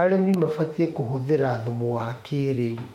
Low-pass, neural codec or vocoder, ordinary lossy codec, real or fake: 19.8 kHz; autoencoder, 48 kHz, 32 numbers a frame, DAC-VAE, trained on Japanese speech; MP3, 64 kbps; fake